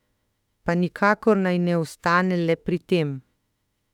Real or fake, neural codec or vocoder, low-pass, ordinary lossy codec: fake; autoencoder, 48 kHz, 32 numbers a frame, DAC-VAE, trained on Japanese speech; 19.8 kHz; none